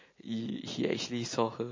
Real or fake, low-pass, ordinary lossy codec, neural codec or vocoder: real; 7.2 kHz; MP3, 32 kbps; none